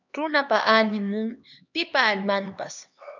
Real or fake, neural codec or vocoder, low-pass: fake; codec, 16 kHz, 2 kbps, X-Codec, HuBERT features, trained on LibriSpeech; 7.2 kHz